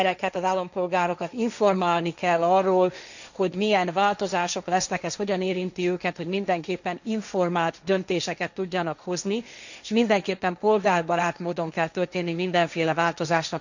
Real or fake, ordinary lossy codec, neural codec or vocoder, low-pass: fake; none; codec, 16 kHz, 1.1 kbps, Voila-Tokenizer; 7.2 kHz